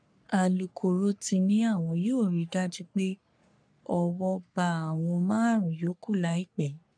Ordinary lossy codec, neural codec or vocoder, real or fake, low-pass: none; codec, 44.1 kHz, 3.4 kbps, Pupu-Codec; fake; 9.9 kHz